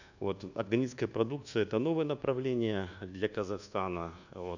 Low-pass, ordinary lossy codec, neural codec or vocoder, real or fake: 7.2 kHz; none; codec, 24 kHz, 1.2 kbps, DualCodec; fake